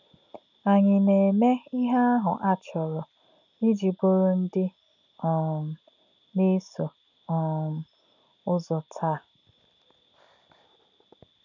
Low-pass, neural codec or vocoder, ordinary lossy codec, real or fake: 7.2 kHz; none; none; real